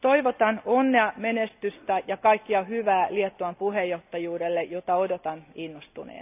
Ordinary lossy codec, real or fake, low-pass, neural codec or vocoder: none; real; 3.6 kHz; none